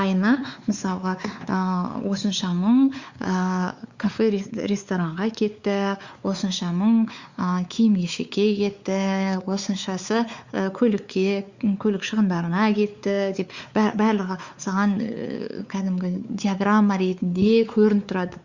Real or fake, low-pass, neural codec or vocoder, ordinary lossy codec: fake; 7.2 kHz; codec, 16 kHz, 4 kbps, X-Codec, WavLM features, trained on Multilingual LibriSpeech; Opus, 64 kbps